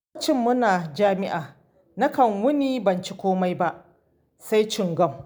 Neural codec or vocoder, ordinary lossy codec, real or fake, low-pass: none; none; real; none